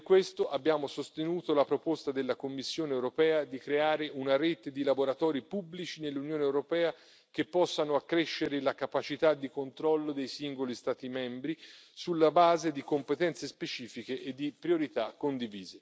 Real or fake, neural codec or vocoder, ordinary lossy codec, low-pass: real; none; none; none